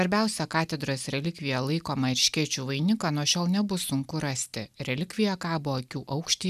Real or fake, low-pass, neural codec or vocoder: real; 14.4 kHz; none